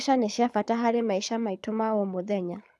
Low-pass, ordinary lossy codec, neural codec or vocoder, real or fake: none; none; codec, 24 kHz, 6 kbps, HILCodec; fake